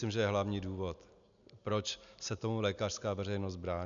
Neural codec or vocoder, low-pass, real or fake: none; 7.2 kHz; real